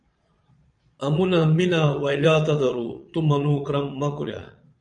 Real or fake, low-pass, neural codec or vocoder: fake; 9.9 kHz; vocoder, 22.05 kHz, 80 mel bands, Vocos